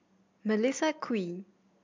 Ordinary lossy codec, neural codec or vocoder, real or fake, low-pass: MP3, 64 kbps; none; real; 7.2 kHz